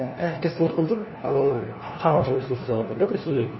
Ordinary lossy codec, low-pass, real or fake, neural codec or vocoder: MP3, 24 kbps; 7.2 kHz; fake; codec, 16 kHz, 1 kbps, FunCodec, trained on LibriTTS, 50 frames a second